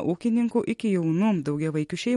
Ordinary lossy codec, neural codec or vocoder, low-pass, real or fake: MP3, 48 kbps; none; 19.8 kHz; real